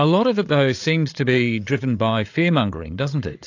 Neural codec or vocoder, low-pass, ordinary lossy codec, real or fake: codec, 16 kHz, 8 kbps, FreqCodec, larger model; 7.2 kHz; AAC, 48 kbps; fake